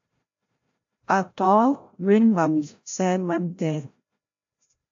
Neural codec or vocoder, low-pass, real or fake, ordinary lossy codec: codec, 16 kHz, 0.5 kbps, FreqCodec, larger model; 7.2 kHz; fake; AAC, 64 kbps